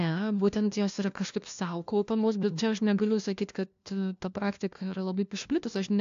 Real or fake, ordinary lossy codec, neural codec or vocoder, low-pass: fake; AAC, 64 kbps; codec, 16 kHz, 1 kbps, FunCodec, trained on LibriTTS, 50 frames a second; 7.2 kHz